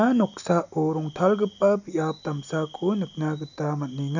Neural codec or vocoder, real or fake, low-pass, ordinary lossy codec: none; real; 7.2 kHz; none